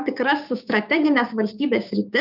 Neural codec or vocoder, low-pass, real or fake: codec, 16 kHz, 6 kbps, DAC; 5.4 kHz; fake